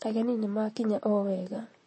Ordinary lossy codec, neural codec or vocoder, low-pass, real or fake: MP3, 32 kbps; vocoder, 44.1 kHz, 128 mel bands, Pupu-Vocoder; 9.9 kHz; fake